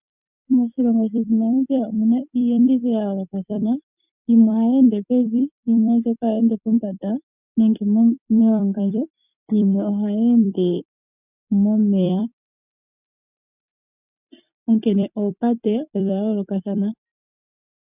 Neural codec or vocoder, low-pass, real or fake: vocoder, 44.1 kHz, 128 mel bands every 256 samples, BigVGAN v2; 3.6 kHz; fake